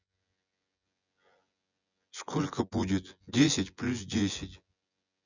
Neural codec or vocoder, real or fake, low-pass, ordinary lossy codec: vocoder, 24 kHz, 100 mel bands, Vocos; fake; 7.2 kHz; none